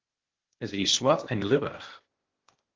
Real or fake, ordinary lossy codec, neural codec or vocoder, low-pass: fake; Opus, 16 kbps; codec, 16 kHz, 0.8 kbps, ZipCodec; 7.2 kHz